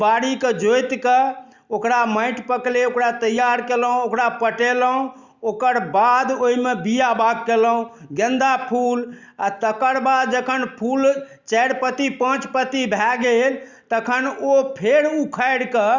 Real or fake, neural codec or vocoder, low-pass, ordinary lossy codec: real; none; 7.2 kHz; Opus, 64 kbps